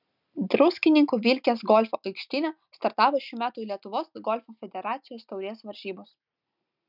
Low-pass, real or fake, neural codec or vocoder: 5.4 kHz; real; none